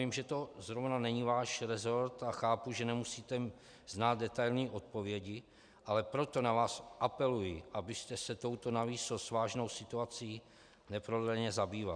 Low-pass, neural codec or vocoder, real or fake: 9.9 kHz; none; real